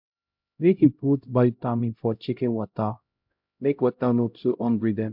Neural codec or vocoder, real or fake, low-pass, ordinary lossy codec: codec, 16 kHz, 0.5 kbps, X-Codec, HuBERT features, trained on LibriSpeech; fake; 5.4 kHz; MP3, 48 kbps